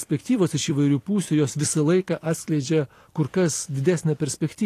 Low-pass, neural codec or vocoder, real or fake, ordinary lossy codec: 14.4 kHz; vocoder, 44.1 kHz, 128 mel bands every 256 samples, BigVGAN v2; fake; AAC, 48 kbps